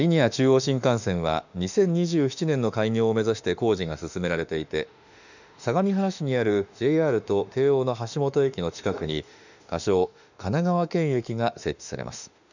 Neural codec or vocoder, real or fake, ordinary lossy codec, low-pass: autoencoder, 48 kHz, 32 numbers a frame, DAC-VAE, trained on Japanese speech; fake; none; 7.2 kHz